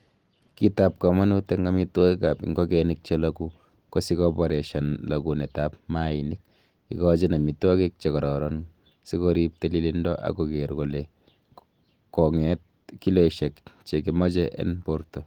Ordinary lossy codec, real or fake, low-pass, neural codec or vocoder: Opus, 24 kbps; real; 19.8 kHz; none